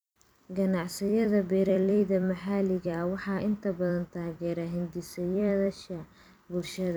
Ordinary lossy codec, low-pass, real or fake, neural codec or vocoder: none; none; fake; vocoder, 44.1 kHz, 128 mel bands every 256 samples, BigVGAN v2